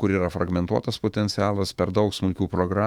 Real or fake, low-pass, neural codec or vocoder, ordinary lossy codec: fake; 19.8 kHz; autoencoder, 48 kHz, 128 numbers a frame, DAC-VAE, trained on Japanese speech; Opus, 64 kbps